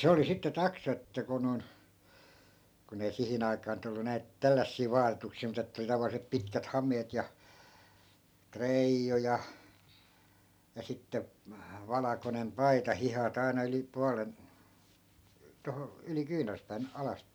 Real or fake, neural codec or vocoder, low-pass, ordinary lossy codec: real; none; none; none